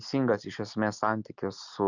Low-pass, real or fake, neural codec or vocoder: 7.2 kHz; real; none